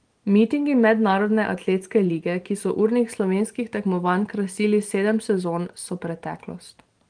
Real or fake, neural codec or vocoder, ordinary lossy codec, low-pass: fake; vocoder, 44.1 kHz, 128 mel bands every 512 samples, BigVGAN v2; Opus, 24 kbps; 9.9 kHz